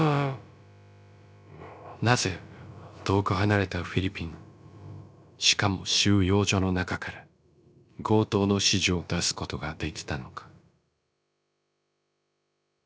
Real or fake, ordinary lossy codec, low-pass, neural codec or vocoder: fake; none; none; codec, 16 kHz, about 1 kbps, DyCAST, with the encoder's durations